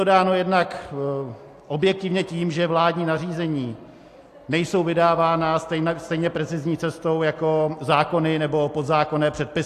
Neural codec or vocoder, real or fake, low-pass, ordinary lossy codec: none; real; 14.4 kHz; AAC, 64 kbps